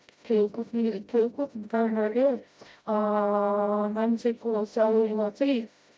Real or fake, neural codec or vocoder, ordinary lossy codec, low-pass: fake; codec, 16 kHz, 0.5 kbps, FreqCodec, smaller model; none; none